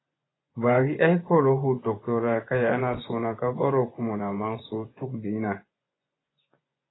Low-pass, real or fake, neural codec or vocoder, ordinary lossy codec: 7.2 kHz; fake; vocoder, 24 kHz, 100 mel bands, Vocos; AAC, 16 kbps